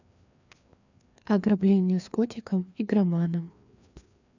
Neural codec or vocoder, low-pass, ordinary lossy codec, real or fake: codec, 16 kHz, 2 kbps, FreqCodec, larger model; 7.2 kHz; none; fake